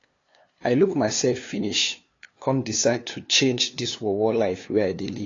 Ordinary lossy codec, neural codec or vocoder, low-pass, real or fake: AAC, 32 kbps; codec, 16 kHz, 2 kbps, FunCodec, trained on LibriTTS, 25 frames a second; 7.2 kHz; fake